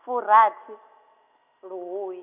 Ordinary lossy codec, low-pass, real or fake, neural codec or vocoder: none; 3.6 kHz; real; none